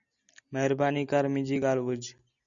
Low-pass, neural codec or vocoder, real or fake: 7.2 kHz; none; real